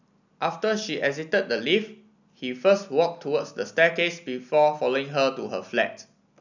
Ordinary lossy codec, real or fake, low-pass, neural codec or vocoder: none; real; 7.2 kHz; none